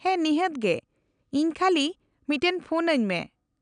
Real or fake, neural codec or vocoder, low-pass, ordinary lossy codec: real; none; 9.9 kHz; none